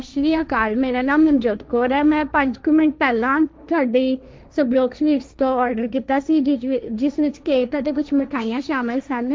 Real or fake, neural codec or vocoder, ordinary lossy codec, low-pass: fake; codec, 16 kHz, 1.1 kbps, Voila-Tokenizer; none; none